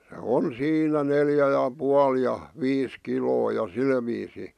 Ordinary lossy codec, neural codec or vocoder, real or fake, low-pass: none; none; real; 14.4 kHz